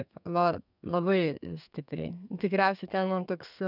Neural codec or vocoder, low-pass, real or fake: codec, 32 kHz, 1.9 kbps, SNAC; 5.4 kHz; fake